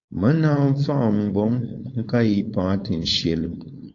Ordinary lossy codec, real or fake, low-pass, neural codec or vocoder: AAC, 48 kbps; fake; 7.2 kHz; codec, 16 kHz, 4.8 kbps, FACodec